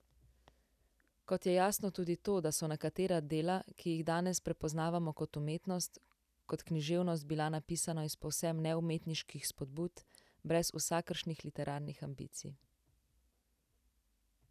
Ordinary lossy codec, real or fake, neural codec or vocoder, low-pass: none; real; none; 14.4 kHz